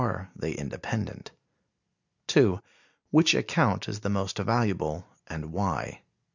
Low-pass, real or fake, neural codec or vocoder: 7.2 kHz; real; none